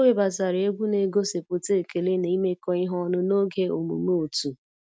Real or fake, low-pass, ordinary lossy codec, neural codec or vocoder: real; none; none; none